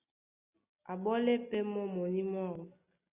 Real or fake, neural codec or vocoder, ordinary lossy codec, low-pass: real; none; Opus, 64 kbps; 3.6 kHz